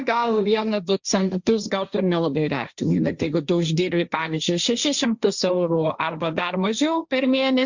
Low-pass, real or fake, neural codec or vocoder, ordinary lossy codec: 7.2 kHz; fake; codec, 16 kHz, 1.1 kbps, Voila-Tokenizer; Opus, 64 kbps